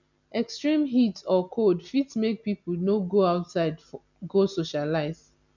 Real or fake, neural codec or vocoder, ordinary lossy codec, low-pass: real; none; none; 7.2 kHz